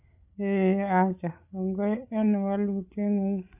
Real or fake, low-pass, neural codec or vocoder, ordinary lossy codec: fake; 3.6 kHz; codec, 16 kHz, 8 kbps, FreqCodec, larger model; none